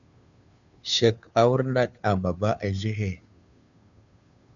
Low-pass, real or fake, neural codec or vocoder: 7.2 kHz; fake; codec, 16 kHz, 2 kbps, FunCodec, trained on Chinese and English, 25 frames a second